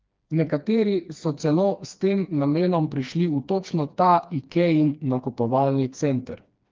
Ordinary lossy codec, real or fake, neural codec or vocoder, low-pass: Opus, 24 kbps; fake; codec, 16 kHz, 2 kbps, FreqCodec, smaller model; 7.2 kHz